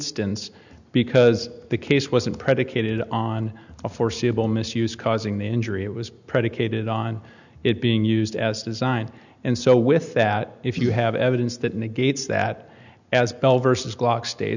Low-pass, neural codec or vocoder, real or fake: 7.2 kHz; none; real